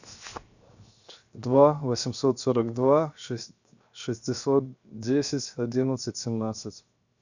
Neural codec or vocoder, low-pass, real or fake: codec, 16 kHz, 0.7 kbps, FocalCodec; 7.2 kHz; fake